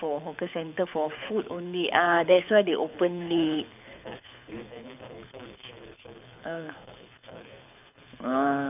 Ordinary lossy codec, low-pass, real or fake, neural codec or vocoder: none; 3.6 kHz; fake; codec, 24 kHz, 6 kbps, HILCodec